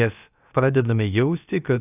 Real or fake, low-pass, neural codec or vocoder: fake; 3.6 kHz; codec, 16 kHz, about 1 kbps, DyCAST, with the encoder's durations